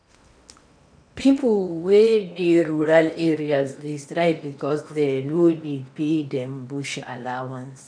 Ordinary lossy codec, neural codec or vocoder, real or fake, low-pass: none; codec, 16 kHz in and 24 kHz out, 0.8 kbps, FocalCodec, streaming, 65536 codes; fake; 9.9 kHz